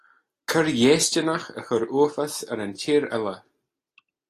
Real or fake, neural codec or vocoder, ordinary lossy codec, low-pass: real; none; AAC, 48 kbps; 14.4 kHz